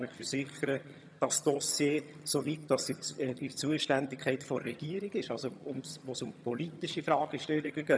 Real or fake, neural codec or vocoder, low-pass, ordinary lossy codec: fake; vocoder, 22.05 kHz, 80 mel bands, HiFi-GAN; none; none